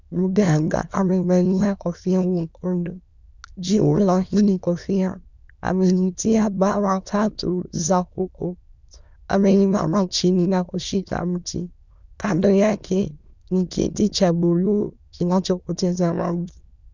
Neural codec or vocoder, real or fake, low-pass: autoencoder, 22.05 kHz, a latent of 192 numbers a frame, VITS, trained on many speakers; fake; 7.2 kHz